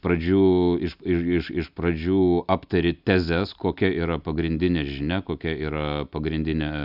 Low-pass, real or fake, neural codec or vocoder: 5.4 kHz; real; none